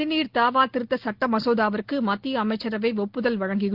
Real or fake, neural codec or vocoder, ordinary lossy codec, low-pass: real; none; Opus, 16 kbps; 5.4 kHz